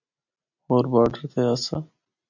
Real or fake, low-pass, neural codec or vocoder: real; 7.2 kHz; none